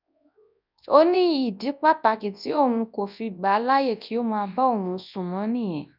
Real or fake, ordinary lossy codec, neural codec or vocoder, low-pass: fake; none; codec, 24 kHz, 0.9 kbps, WavTokenizer, large speech release; 5.4 kHz